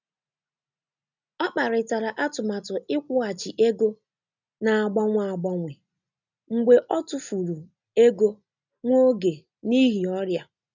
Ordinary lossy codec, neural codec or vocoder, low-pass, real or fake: none; none; 7.2 kHz; real